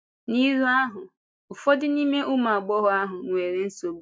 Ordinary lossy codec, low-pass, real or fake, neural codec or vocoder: none; none; real; none